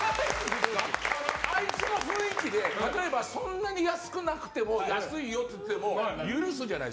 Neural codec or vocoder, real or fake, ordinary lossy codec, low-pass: none; real; none; none